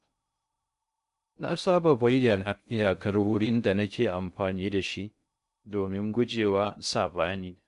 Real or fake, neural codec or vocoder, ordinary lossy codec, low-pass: fake; codec, 16 kHz in and 24 kHz out, 0.6 kbps, FocalCodec, streaming, 2048 codes; MP3, 96 kbps; 10.8 kHz